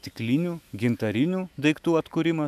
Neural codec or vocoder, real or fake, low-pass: autoencoder, 48 kHz, 128 numbers a frame, DAC-VAE, trained on Japanese speech; fake; 14.4 kHz